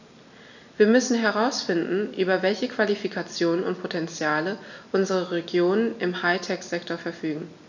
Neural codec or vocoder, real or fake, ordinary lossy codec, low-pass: none; real; none; 7.2 kHz